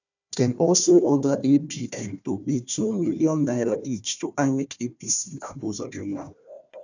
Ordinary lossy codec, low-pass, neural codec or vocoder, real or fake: none; 7.2 kHz; codec, 16 kHz, 1 kbps, FunCodec, trained on Chinese and English, 50 frames a second; fake